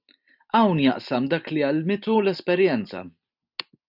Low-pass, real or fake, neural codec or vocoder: 5.4 kHz; real; none